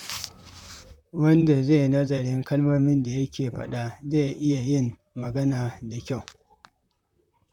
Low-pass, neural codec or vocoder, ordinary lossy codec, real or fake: 19.8 kHz; vocoder, 44.1 kHz, 128 mel bands, Pupu-Vocoder; none; fake